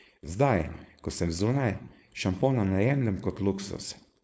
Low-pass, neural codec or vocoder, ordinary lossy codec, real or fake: none; codec, 16 kHz, 4.8 kbps, FACodec; none; fake